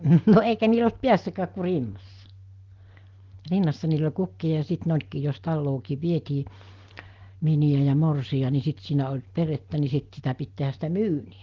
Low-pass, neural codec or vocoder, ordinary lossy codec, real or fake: 7.2 kHz; none; Opus, 16 kbps; real